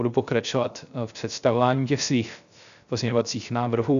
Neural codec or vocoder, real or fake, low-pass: codec, 16 kHz, 0.3 kbps, FocalCodec; fake; 7.2 kHz